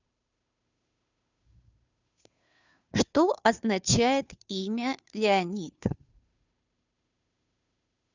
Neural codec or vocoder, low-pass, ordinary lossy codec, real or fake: codec, 16 kHz, 2 kbps, FunCodec, trained on Chinese and English, 25 frames a second; 7.2 kHz; none; fake